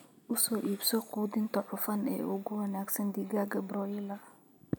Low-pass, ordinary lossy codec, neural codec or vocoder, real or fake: none; none; none; real